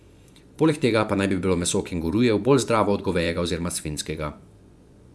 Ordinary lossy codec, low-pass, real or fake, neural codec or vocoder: none; none; fake; vocoder, 24 kHz, 100 mel bands, Vocos